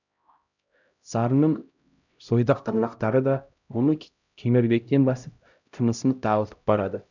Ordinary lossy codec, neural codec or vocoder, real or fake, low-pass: none; codec, 16 kHz, 0.5 kbps, X-Codec, HuBERT features, trained on LibriSpeech; fake; 7.2 kHz